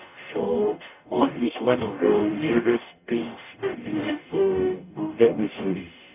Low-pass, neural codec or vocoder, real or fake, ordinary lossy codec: 3.6 kHz; codec, 44.1 kHz, 0.9 kbps, DAC; fake; none